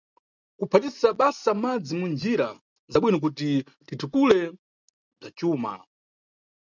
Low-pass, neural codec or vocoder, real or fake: 7.2 kHz; none; real